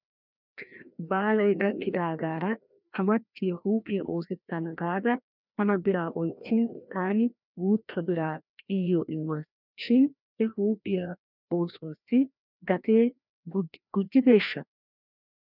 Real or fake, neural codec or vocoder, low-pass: fake; codec, 16 kHz, 1 kbps, FreqCodec, larger model; 5.4 kHz